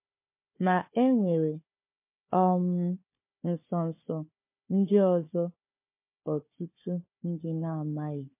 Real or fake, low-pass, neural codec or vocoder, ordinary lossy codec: fake; 3.6 kHz; codec, 16 kHz, 4 kbps, FunCodec, trained on Chinese and English, 50 frames a second; MP3, 24 kbps